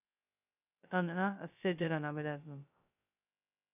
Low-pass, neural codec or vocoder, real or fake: 3.6 kHz; codec, 16 kHz, 0.2 kbps, FocalCodec; fake